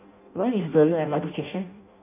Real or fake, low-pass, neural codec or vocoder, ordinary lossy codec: fake; 3.6 kHz; codec, 16 kHz in and 24 kHz out, 0.6 kbps, FireRedTTS-2 codec; none